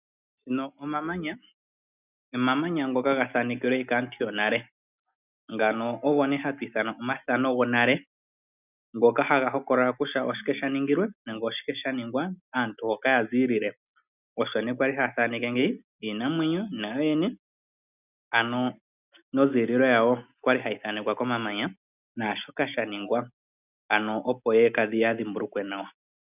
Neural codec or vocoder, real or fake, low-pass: none; real; 3.6 kHz